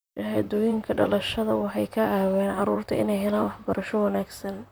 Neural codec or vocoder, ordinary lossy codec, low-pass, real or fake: vocoder, 44.1 kHz, 128 mel bands, Pupu-Vocoder; none; none; fake